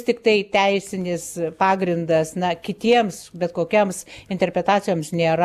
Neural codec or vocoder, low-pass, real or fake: none; 14.4 kHz; real